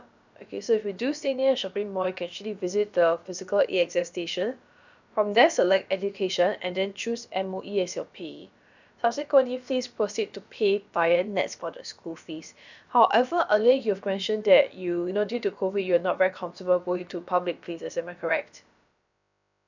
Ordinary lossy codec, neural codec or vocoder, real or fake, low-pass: none; codec, 16 kHz, about 1 kbps, DyCAST, with the encoder's durations; fake; 7.2 kHz